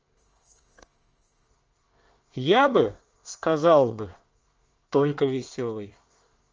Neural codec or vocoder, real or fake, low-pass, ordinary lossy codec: codec, 24 kHz, 1 kbps, SNAC; fake; 7.2 kHz; Opus, 24 kbps